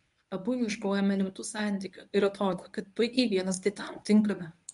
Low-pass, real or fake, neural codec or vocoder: 10.8 kHz; fake; codec, 24 kHz, 0.9 kbps, WavTokenizer, medium speech release version 1